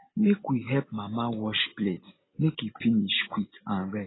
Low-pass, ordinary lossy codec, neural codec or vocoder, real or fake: 7.2 kHz; AAC, 16 kbps; none; real